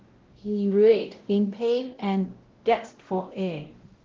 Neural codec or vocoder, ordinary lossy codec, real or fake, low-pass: codec, 16 kHz, 0.5 kbps, X-Codec, WavLM features, trained on Multilingual LibriSpeech; Opus, 16 kbps; fake; 7.2 kHz